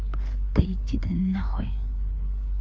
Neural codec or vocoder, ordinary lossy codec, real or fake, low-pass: codec, 16 kHz, 4 kbps, FreqCodec, larger model; none; fake; none